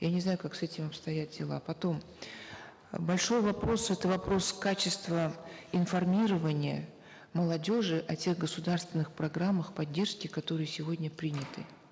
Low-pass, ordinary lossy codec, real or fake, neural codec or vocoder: none; none; real; none